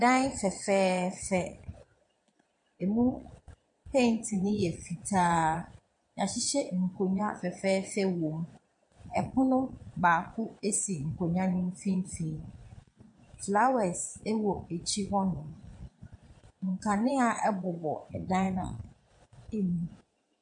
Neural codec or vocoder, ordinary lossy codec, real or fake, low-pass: vocoder, 22.05 kHz, 80 mel bands, Vocos; MP3, 48 kbps; fake; 9.9 kHz